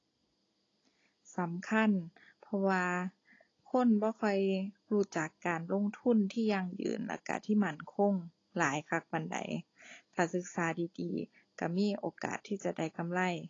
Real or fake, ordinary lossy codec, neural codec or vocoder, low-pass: real; AAC, 32 kbps; none; 7.2 kHz